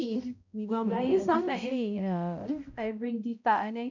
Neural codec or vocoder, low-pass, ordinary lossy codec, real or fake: codec, 16 kHz, 0.5 kbps, X-Codec, HuBERT features, trained on balanced general audio; 7.2 kHz; none; fake